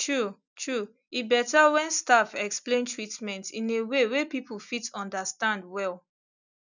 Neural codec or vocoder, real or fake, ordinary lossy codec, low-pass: none; real; none; 7.2 kHz